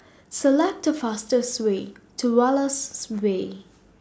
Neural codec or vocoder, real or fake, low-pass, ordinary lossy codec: none; real; none; none